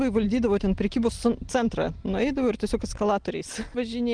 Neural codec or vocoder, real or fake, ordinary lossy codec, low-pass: none; real; Opus, 24 kbps; 9.9 kHz